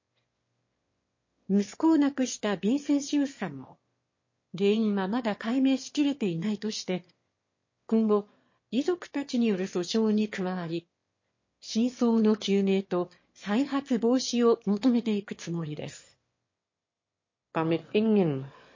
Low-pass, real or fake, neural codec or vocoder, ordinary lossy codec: 7.2 kHz; fake; autoencoder, 22.05 kHz, a latent of 192 numbers a frame, VITS, trained on one speaker; MP3, 32 kbps